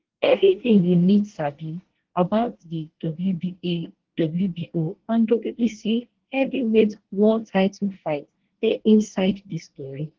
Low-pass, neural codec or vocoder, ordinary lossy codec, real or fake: 7.2 kHz; codec, 24 kHz, 1 kbps, SNAC; Opus, 16 kbps; fake